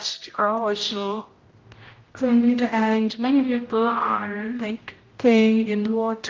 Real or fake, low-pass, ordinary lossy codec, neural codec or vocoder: fake; 7.2 kHz; Opus, 32 kbps; codec, 16 kHz, 0.5 kbps, X-Codec, HuBERT features, trained on general audio